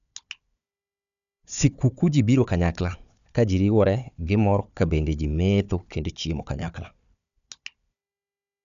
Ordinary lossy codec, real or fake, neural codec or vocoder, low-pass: none; fake; codec, 16 kHz, 4 kbps, FunCodec, trained on Chinese and English, 50 frames a second; 7.2 kHz